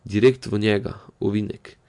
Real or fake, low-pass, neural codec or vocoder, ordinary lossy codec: real; 10.8 kHz; none; MP3, 64 kbps